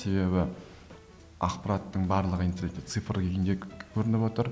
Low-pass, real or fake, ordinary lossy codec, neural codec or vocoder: none; real; none; none